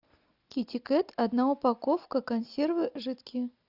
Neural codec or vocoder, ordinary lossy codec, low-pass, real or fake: none; AAC, 48 kbps; 5.4 kHz; real